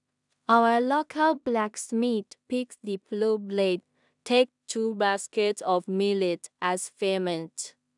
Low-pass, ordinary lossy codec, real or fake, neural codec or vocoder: 10.8 kHz; none; fake; codec, 16 kHz in and 24 kHz out, 0.4 kbps, LongCat-Audio-Codec, two codebook decoder